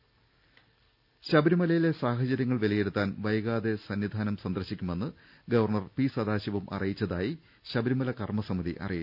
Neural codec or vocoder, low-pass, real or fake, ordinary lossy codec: none; 5.4 kHz; real; none